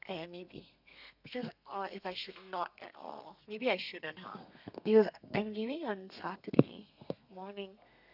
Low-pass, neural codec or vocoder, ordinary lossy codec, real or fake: 5.4 kHz; codec, 32 kHz, 1.9 kbps, SNAC; none; fake